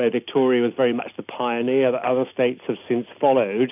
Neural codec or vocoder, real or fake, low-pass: none; real; 3.6 kHz